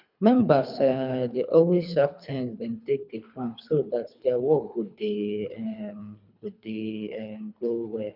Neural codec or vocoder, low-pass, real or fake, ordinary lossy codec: codec, 24 kHz, 3 kbps, HILCodec; 5.4 kHz; fake; none